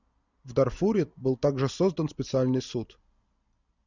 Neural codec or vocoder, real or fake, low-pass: none; real; 7.2 kHz